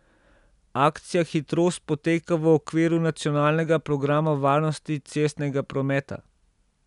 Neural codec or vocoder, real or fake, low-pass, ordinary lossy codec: none; real; 10.8 kHz; none